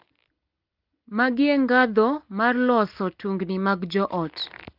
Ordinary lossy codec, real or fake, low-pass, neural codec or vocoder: Opus, 32 kbps; real; 5.4 kHz; none